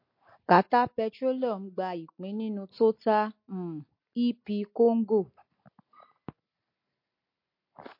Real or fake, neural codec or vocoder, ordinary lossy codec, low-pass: real; none; MP3, 32 kbps; 5.4 kHz